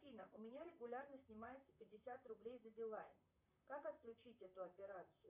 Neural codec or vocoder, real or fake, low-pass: vocoder, 44.1 kHz, 128 mel bands, Pupu-Vocoder; fake; 3.6 kHz